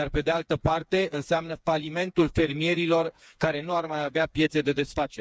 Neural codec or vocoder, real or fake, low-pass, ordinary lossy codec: codec, 16 kHz, 4 kbps, FreqCodec, smaller model; fake; none; none